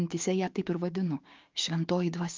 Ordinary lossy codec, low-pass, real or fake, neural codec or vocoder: Opus, 24 kbps; 7.2 kHz; fake; codec, 16 kHz, 6 kbps, DAC